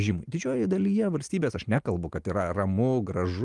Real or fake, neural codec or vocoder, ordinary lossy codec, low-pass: real; none; Opus, 24 kbps; 10.8 kHz